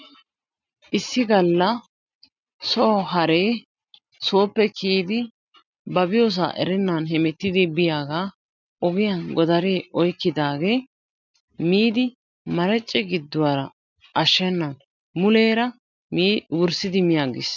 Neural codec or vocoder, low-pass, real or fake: none; 7.2 kHz; real